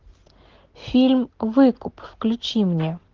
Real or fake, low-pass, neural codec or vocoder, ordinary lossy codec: real; 7.2 kHz; none; Opus, 16 kbps